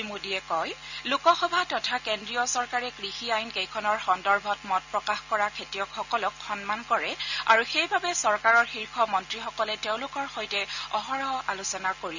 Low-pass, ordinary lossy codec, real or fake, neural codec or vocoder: 7.2 kHz; none; real; none